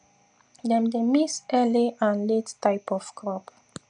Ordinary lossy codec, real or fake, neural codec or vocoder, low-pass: none; real; none; 9.9 kHz